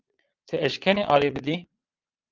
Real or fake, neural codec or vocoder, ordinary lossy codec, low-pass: fake; vocoder, 22.05 kHz, 80 mel bands, Vocos; Opus, 24 kbps; 7.2 kHz